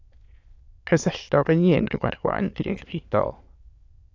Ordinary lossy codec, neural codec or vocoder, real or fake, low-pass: AAC, 48 kbps; autoencoder, 22.05 kHz, a latent of 192 numbers a frame, VITS, trained on many speakers; fake; 7.2 kHz